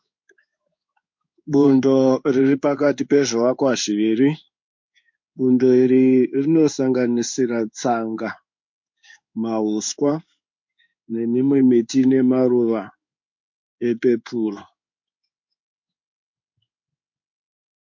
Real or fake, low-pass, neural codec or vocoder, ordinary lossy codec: fake; 7.2 kHz; codec, 16 kHz in and 24 kHz out, 1 kbps, XY-Tokenizer; MP3, 48 kbps